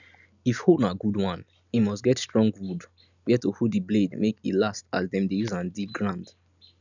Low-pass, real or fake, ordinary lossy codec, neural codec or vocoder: 7.2 kHz; real; none; none